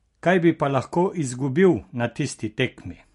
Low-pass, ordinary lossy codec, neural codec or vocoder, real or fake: 14.4 kHz; MP3, 48 kbps; none; real